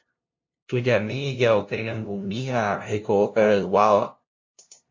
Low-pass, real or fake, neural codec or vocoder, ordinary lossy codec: 7.2 kHz; fake; codec, 16 kHz, 0.5 kbps, FunCodec, trained on LibriTTS, 25 frames a second; MP3, 48 kbps